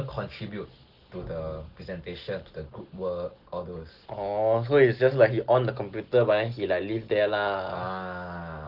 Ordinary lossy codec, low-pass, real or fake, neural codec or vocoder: Opus, 16 kbps; 5.4 kHz; real; none